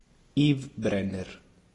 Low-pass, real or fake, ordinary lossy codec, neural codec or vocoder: 10.8 kHz; real; AAC, 32 kbps; none